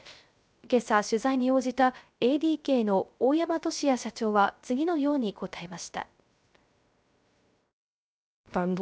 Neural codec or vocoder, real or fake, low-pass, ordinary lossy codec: codec, 16 kHz, 0.3 kbps, FocalCodec; fake; none; none